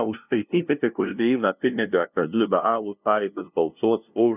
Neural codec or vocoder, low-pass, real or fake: codec, 16 kHz, 0.5 kbps, FunCodec, trained on LibriTTS, 25 frames a second; 3.6 kHz; fake